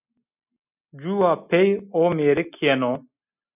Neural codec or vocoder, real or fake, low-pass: none; real; 3.6 kHz